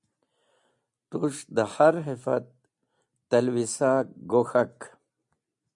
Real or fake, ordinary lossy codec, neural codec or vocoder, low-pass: real; MP3, 64 kbps; none; 10.8 kHz